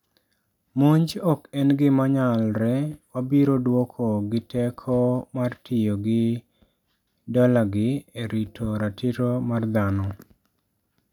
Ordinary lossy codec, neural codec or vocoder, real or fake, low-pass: none; none; real; 19.8 kHz